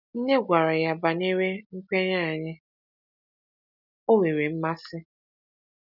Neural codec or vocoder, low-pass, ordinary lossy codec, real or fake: none; 5.4 kHz; none; real